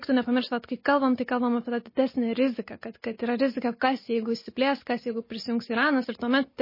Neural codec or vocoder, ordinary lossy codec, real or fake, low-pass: none; MP3, 24 kbps; real; 5.4 kHz